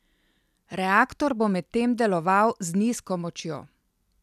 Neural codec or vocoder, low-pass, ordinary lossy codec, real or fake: none; 14.4 kHz; none; real